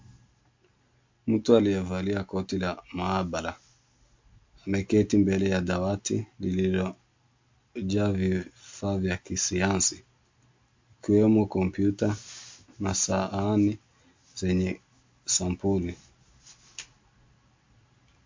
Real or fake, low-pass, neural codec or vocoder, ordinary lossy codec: real; 7.2 kHz; none; MP3, 64 kbps